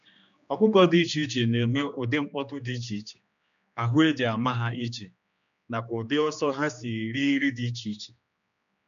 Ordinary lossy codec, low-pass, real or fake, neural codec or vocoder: none; 7.2 kHz; fake; codec, 16 kHz, 2 kbps, X-Codec, HuBERT features, trained on general audio